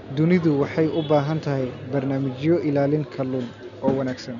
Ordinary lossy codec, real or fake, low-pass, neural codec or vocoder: none; real; 7.2 kHz; none